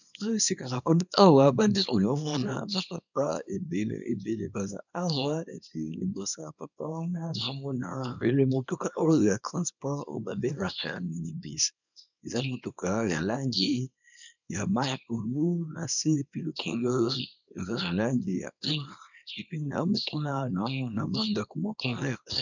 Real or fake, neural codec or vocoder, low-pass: fake; codec, 24 kHz, 0.9 kbps, WavTokenizer, small release; 7.2 kHz